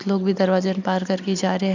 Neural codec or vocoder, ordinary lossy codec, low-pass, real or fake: none; none; 7.2 kHz; real